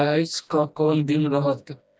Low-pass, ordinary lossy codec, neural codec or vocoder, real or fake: none; none; codec, 16 kHz, 1 kbps, FreqCodec, smaller model; fake